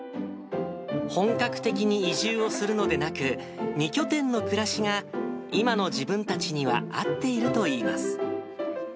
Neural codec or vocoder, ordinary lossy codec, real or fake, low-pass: none; none; real; none